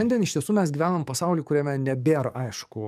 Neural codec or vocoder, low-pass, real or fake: codec, 44.1 kHz, 7.8 kbps, DAC; 14.4 kHz; fake